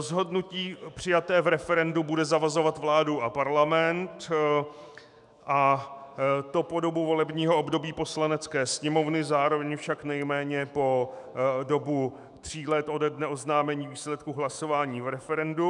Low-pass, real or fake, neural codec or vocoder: 10.8 kHz; fake; autoencoder, 48 kHz, 128 numbers a frame, DAC-VAE, trained on Japanese speech